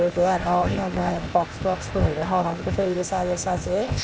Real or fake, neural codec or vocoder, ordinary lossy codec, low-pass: fake; codec, 16 kHz, 0.8 kbps, ZipCodec; none; none